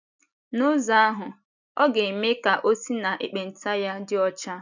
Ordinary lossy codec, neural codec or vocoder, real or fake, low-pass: none; none; real; 7.2 kHz